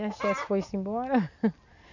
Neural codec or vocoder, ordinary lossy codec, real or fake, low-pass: none; none; real; 7.2 kHz